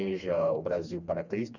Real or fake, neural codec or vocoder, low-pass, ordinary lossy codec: fake; codec, 16 kHz, 2 kbps, FreqCodec, smaller model; 7.2 kHz; none